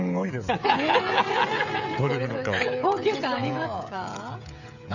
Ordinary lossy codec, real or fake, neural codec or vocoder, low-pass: none; fake; codec, 16 kHz, 16 kbps, FreqCodec, smaller model; 7.2 kHz